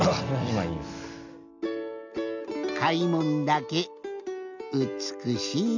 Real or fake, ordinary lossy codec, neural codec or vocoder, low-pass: real; none; none; 7.2 kHz